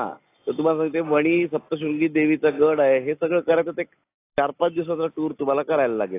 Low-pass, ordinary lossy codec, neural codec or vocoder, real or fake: 3.6 kHz; AAC, 24 kbps; none; real